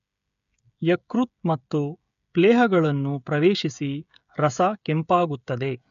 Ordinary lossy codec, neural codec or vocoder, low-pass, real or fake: none; codec, 16 kHz, 16 kbps, FreqCodec, smaller model; 7.2 kHz; fake